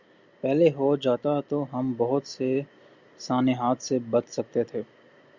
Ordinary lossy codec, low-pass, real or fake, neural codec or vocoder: Opus, 64 kbps; 7.2 kHz; real; none